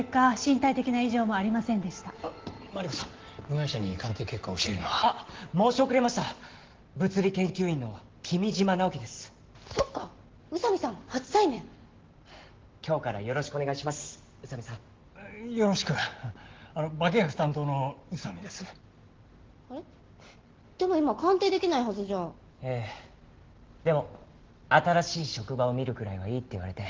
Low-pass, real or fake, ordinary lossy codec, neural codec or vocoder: 7.2 kHz; real; Opus, 16 kbps; none